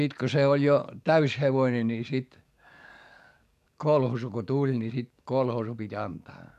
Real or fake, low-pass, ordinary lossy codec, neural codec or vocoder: fake; 14.4 kHz; none; codec, 44.1 kHz, 7.8 kbps, DAC